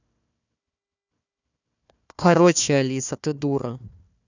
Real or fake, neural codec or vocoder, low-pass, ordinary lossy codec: fake; codec, 16 kHz, 1 kbps, FunCodec, trained on Chinese and English, 50 frames a second; 7.2 kHz; none